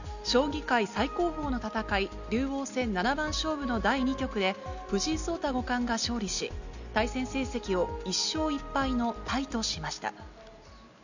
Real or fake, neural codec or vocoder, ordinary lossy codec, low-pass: real; none; none; 7.2 kHz